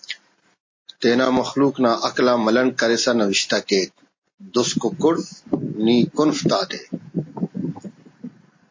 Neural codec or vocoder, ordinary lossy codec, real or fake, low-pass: none; MP3, 32 kbps; real; 7.2 kHz